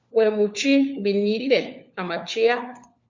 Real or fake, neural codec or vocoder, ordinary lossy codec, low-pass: fake; codec, 16 kHz, 4 kbps, FunCodec, trained on LibriTTS, 50 frames a second; Opus, 64 kbps; 7.2 kHz